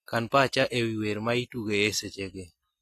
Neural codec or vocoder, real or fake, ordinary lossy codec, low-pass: none; real; AAC, 48 kbps; 14.4 kHz